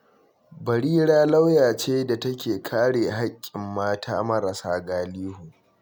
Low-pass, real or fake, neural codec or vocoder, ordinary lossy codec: none; real; none; none